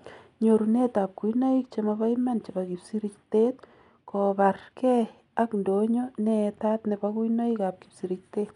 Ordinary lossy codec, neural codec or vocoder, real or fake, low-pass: none; none; real; 10.8 kHz